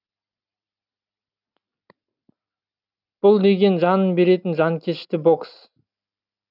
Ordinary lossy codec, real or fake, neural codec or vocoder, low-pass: none; real; none; 5.4 kHz